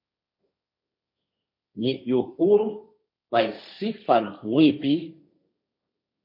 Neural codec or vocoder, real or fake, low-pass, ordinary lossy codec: codec, 16 kHz, 1.1 kbps, Voila-Tokenizer; fake; 5.4 kHz; MP3, 32 kbps